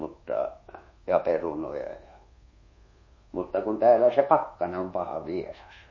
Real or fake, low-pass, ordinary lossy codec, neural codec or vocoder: fake; 7.2 kHz; MP3, 32 kbps; codec, 24 kHz, 1.2 kbps, DualCodec